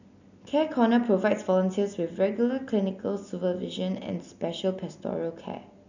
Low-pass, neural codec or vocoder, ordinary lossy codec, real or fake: 7.2 kHz; none; none; real